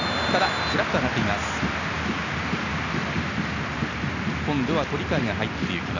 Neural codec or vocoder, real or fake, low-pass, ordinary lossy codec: none; real; 7.2 kHz; none